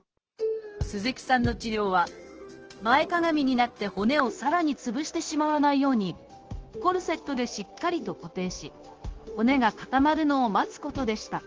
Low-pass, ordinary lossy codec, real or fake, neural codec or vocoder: 7.2 kHz; Opus, 16 kbps; fake; codec, 16 kHz, 0.9 kbps, LongCat-Audio-Codec